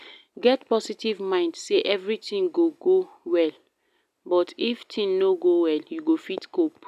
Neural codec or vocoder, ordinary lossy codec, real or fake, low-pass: none; none; real; 14.4 kHz